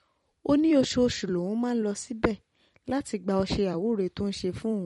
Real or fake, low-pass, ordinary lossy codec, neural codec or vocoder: fake; 19.8 kHz; MP3, 48 kbps; vocoder, 44.1 kHz, 128 mel bands every 256 samples, BigVGAN v2